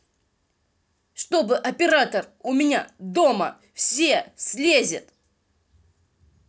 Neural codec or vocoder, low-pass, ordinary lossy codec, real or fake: none; none; none; real